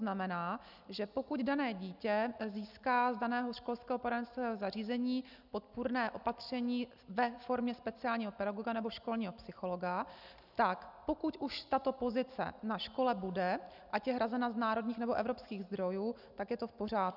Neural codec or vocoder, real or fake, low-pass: none; real; 5.4 kHz